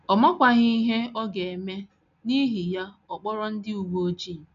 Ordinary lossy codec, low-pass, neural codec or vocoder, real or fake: none; 7.2 kHz; none; real